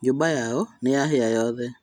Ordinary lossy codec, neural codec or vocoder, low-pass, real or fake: none; none; 19.8 kHz; real